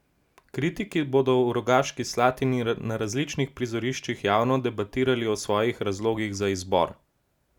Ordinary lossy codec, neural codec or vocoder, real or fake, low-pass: none; none; real; 19.8 kHz